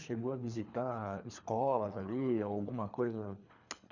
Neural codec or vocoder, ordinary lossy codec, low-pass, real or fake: codec, 24 kHz, 3 kbps, HILCodec; none; 7.2 kHz; fake